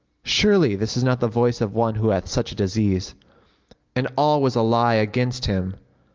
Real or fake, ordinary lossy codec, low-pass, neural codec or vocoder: real; Opus, 24 kbps; 7.2 kHz; none